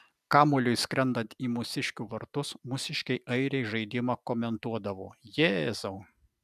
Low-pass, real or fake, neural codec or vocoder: 14.4 kHz; real; none